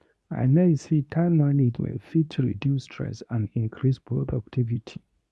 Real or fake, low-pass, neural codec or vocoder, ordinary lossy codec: fake; none; codec, 24 kHz, 0.9 kbps, WavTokenizer, medium speech release version 2; none